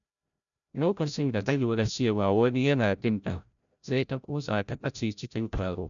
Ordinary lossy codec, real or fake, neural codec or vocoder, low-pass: none; fake; codec, 16 kHz, 0.5 kbps, FreqCodec, larger model; 7.2 kHz